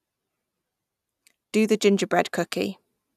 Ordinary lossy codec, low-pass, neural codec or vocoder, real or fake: none; 14.4 kHz; none; real